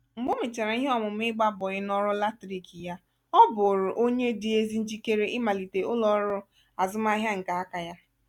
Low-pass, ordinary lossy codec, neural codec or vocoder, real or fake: 19.8 kHz; none; none; real